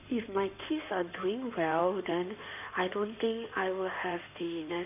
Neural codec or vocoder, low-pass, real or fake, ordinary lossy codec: codec, 16 kHz in and 24 kHz out, 2.2 kbps, FireRedTTS-2 codec; 3.6 kHz; fake; none